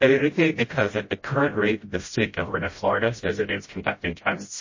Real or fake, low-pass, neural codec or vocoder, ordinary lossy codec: fake; 7.2 kHz; codec, 16 kHz, 0.5 kbps, FreqCodec, smaller model; MP3, 32 kbps